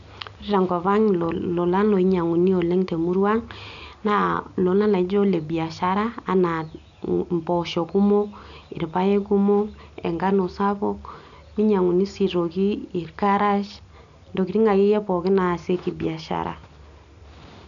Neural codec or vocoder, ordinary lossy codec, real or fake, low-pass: none; none; real; 7.2 kHz